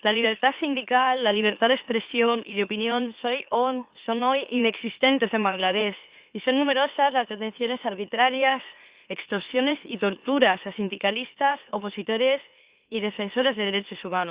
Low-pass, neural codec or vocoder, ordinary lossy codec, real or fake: 3.6 kHz; autoencoder, 44.1 kHz, a latent of 192 numbers a frame, MeloTTS; Opus, 64 kbps; fake